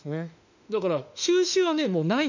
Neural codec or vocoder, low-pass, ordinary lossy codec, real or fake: autoencoder, 48 kHz, 32 numbers a frame, DAC-VAE, trained on Japanese speech; 7.2 kHz; none; fake